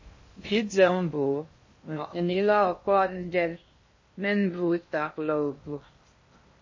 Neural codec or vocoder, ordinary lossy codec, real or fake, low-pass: codec, 16 kHz in and 24 kHz out, 0.6 kbps, FocalCodec, streaming, 2048 codes; MP3, 32 kbps; fake; 7.2 kHz